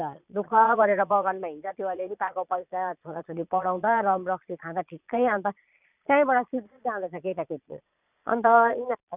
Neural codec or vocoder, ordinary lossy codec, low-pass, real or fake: vocoder, 22.05 kHz, 80 mel bands, Vocos; none; 3.6 kHz; fake